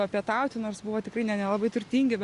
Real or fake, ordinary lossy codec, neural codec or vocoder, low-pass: real; Opus, 64 kbps; none; 10.8 kHz